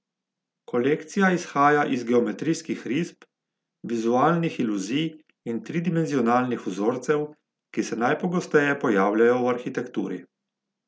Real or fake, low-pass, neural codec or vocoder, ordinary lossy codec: real; none; none; none